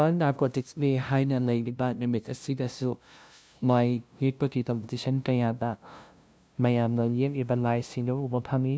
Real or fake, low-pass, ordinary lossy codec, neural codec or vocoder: fake; none; none; codec, 16 kHz, 0.5 kbps, FunCodec, trained on LibriTTS, 25 frames a second